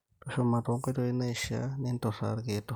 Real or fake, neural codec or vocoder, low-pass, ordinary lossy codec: real; none; none; none